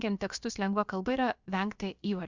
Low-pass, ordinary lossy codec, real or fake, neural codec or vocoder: 7.2 kHz; Opus, 64 kbps; fake; codec, 16 kHz, about 1 kbps, DyCAST, with the encoder's durations